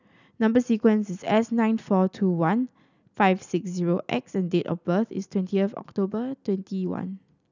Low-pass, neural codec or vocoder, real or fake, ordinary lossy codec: 7.2 kHz; none; real; none